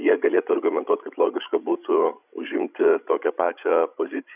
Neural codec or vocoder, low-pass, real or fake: codec, 16 kHz, 16 kbps, FreqCodec, larger model; 3.6 kHz; fake